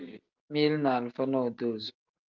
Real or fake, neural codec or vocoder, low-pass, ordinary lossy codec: real; none; 7.2 kHz; Opus, 24 kbps